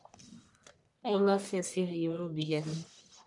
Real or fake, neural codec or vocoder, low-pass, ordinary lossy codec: fake; codec, 44.1 kHz, 1.7 kbps, Pupu-Codec; 10.8 kHz; none